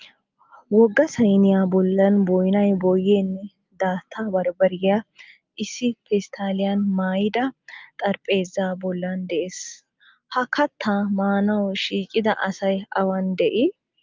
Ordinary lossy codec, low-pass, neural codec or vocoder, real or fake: Opus, 24 kbps; 7.2 kHz; none; real